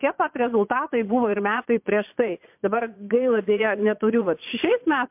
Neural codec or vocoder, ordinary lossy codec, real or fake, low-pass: vocoder, 22.05 kHz, 80 mel bands, Vocos; MP3, 32 kbps; fake; 3.6 kHz